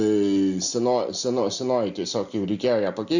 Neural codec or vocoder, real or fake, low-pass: none; real; 7.2 kHz